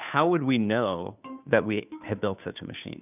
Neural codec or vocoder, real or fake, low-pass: codec, 16 kHz, 2 kbps, FunCodec, trained on Chinese and English, 25 frames a second; fake; 3.6 kHz